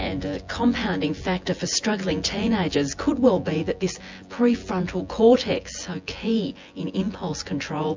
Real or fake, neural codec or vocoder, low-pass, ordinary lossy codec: fake; vocoder, 24 kHz, 100 mel bands, Vocos; 7.2 kHz; MP3, 64 kbps